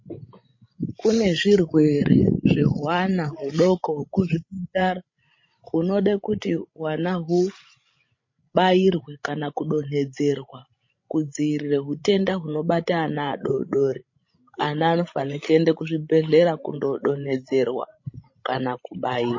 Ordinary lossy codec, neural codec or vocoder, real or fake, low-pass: MP3, 32 kbps; codec, 16 kHz, 16 kbps, FreqCodec, larger model; fake; 7.2 kHz